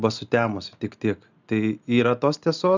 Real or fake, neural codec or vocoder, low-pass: real; none; 7.2 kHz